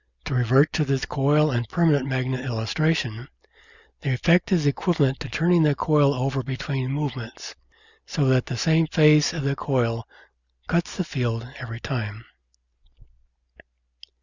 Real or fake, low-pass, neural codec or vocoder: real; 7.2 kHz; none